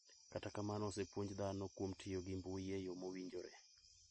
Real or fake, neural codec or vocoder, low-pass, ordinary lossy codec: real; none; 10.8 kHz; MP3, 32 kbps